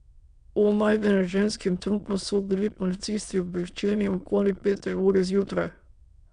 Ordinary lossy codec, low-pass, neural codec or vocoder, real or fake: none; 9.9 kHz; autoencoder, 22.05 kHz, a latent of 192 numbers a frame, VITS, trained on many speakers; fake